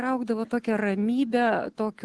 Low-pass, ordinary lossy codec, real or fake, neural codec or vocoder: 10.8 kHz; Opus, 16 kbps; real; none